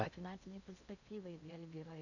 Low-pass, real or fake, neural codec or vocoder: 7.2 kHz; fake; codec, 16 kHz in and 24 kHz out, 0.8 kbps, FocalCodec, streaming, 65536 codes